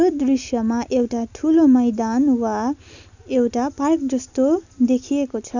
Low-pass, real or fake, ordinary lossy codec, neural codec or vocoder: 7.2 kHz; real; none; none